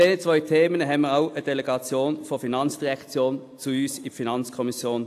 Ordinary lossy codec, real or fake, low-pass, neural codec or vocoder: AAC, 64 kbps; real; 14.4 kHz; none